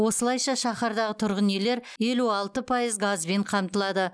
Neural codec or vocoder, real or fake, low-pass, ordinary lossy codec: none; real; none; none